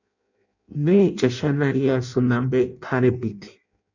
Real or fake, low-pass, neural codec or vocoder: fake; 7.2 kHz; codec, 16 kHz in and 24 kHz out, 0.6 kbps, FireRedTTS-2 codec